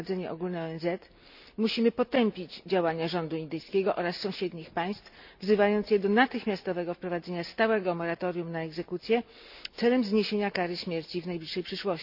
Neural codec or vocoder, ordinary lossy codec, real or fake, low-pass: none; none; real; 5.4 kHz